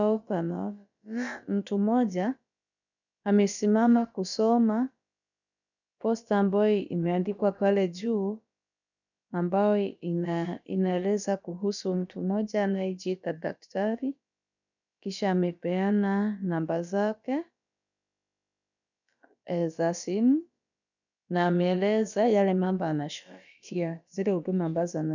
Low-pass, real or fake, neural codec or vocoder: 7.2 kHz; fake; codec, 16 kHz, about 1 kbps, DyCAST, with the encoder's durations